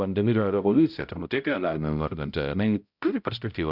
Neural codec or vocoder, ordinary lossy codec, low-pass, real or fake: codec, 16 kHz, 0.5 kbps, X-Codec, HuBERT features, trained on balanced general audio; Opus, 64 kbps; 5.4 kHz; fake